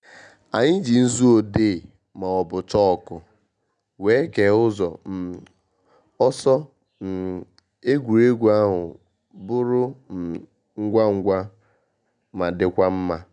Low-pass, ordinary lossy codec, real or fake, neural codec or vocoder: 9.9 kHz; none; real; none